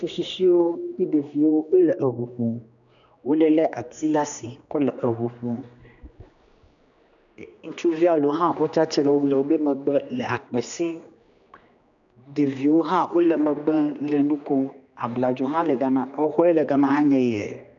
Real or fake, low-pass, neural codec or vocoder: fake; 7.2 kHz; codec, 16 kHz, 2 kbps, X-Codec, HuBERT features, trained on general audio